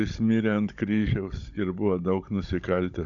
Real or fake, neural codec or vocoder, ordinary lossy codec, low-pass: fake; codec, 16 kHz, 16 kbps, FunCodec, trained on Chinese and English, 50 frames a second; AAC, 64 kbps; 7.2 kHz